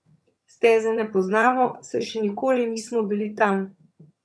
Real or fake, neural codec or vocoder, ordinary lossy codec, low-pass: fake; vocoder, 22.05 kHz, 80 mel bands, HiFi-GAN; none; none